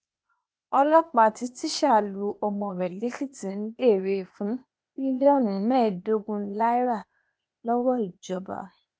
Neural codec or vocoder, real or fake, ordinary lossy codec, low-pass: codec, 16 kHz, 0.8 kbps, ZipCodec; fake; none; none